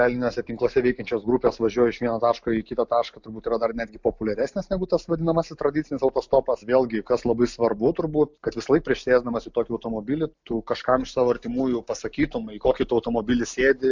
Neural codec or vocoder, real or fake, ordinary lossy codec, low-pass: none; real; MP3, 64 kbps; 7.2 kHz